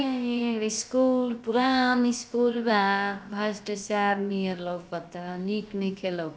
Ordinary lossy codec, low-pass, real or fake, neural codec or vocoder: none; none; fake; codec, 16 kHz, about 1 kbps, DyCAST, with the encoder's durations